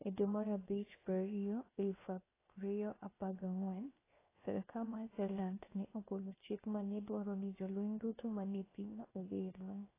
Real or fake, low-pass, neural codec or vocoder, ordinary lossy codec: fake; 3.6 kHz; codec, 16 kHz, 0.7 kbps, FocalCodec; AAC, 16 kbps